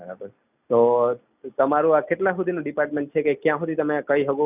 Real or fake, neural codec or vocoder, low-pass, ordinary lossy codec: real; none; 3.6 kHz; none